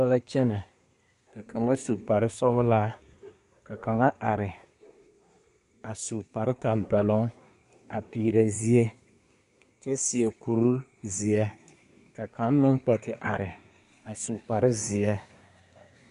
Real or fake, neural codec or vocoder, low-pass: fake; codec, 24 kHz, 1 kbps, SNAC; 10.8 kHz